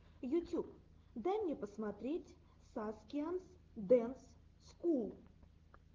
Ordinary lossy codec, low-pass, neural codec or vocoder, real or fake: Opus, 16 kbps; 7.2 kHz; none; real